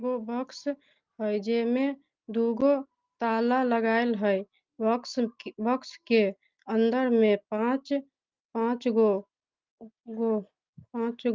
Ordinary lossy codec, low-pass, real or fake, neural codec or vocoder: Opus, 24 kbps; 7.2 kHz; real; none